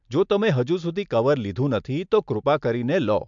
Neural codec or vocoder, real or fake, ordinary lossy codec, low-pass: none; real; MP3, 64 kbps; 7.2 kHz